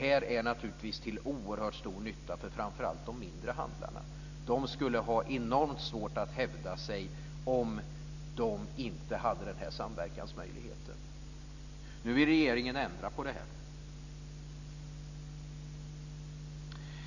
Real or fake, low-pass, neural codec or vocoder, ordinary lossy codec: real; 7.2 kHz; none; none